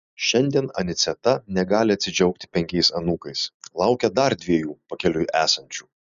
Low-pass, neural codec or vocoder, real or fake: 7.2 kHz; none; real